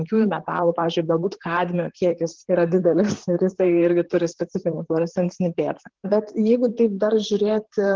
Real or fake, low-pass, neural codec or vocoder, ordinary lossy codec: fake; 7.2 kHz; vocoder, 44.1 kHz, 128 mel bands, Pupu-Vocoder; Opus, 16 kbps